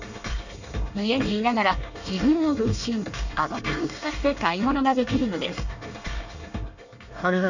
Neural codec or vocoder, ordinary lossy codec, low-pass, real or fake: codec, 24 kHz, 1 kbps, SNAC; none; 7.2 kHz; fake